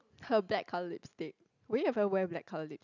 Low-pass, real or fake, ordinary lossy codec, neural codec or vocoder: 7.2 kHz; real; none; none